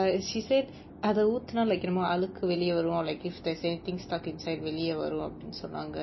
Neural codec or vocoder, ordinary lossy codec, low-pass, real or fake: none; MP3, 24 kbps; 7.2 kHz; real